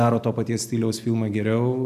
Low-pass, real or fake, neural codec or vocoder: 14.4 kHz; real; none